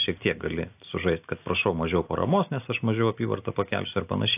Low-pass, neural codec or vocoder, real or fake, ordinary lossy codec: 3.6 kHz; none; real; AAC, 32 kbps